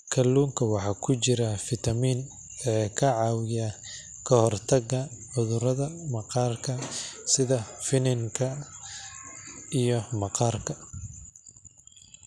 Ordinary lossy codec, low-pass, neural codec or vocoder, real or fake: none; none; none; real